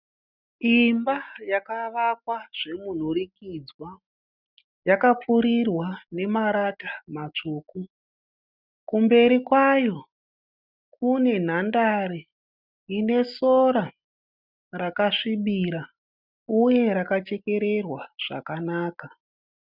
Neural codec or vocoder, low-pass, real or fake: none; 5.4 kHz; real